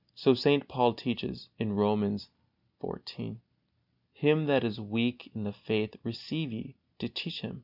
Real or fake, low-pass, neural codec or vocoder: real; 5.4 kHz; none